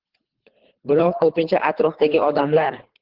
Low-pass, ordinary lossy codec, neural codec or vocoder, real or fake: 5.4 kHz; Opus, 16 kbps; codec, 24 kHz, 3 kbps, HILCodec; fake